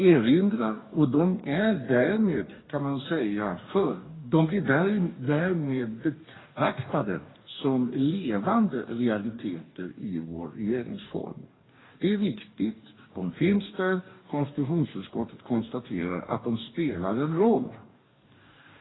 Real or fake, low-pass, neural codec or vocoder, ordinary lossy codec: fake; 7.2 kHz; codec, 44.1 kHz, 2.6 kbps, DAC; AAC, 16 kbps